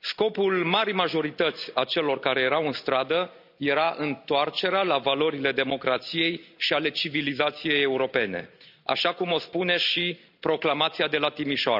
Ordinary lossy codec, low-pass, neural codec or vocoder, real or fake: none; 5.4 kHz; none; real